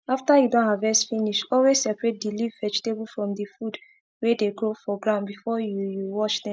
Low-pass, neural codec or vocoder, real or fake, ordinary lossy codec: none; none; real; none